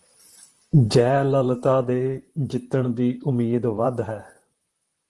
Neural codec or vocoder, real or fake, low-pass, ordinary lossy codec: vocoder, 44.1 kHz, 128 mel bands, Pupu-Vocoder; fake; 10.8 kHz; Opus, 24 kbps